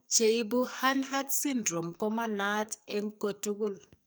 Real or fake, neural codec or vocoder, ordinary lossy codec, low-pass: fake; codec, 44.1 kHz, 2.6 kbps, SNAC; none; none